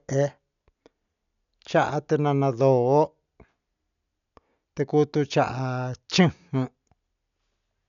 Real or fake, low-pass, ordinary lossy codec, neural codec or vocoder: real; 7.2 kHz; none; none